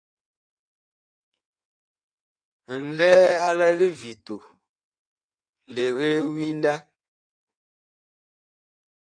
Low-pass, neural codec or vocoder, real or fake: 9.9 kHz; codec, 16 kHz in and 24 kHz out, 1.1 kbps, FireRedTTS-2 codec; fake